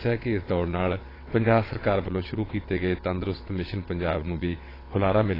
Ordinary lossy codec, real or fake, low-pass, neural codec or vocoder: AAC, 24 kbps; fake; 5.4 kHz; vocoder, 22.05 kHz, 80 mel bands, WaveNeXt